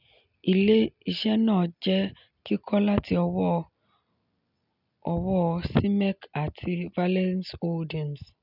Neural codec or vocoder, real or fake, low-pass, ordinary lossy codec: none; real; 5.4 kHz; none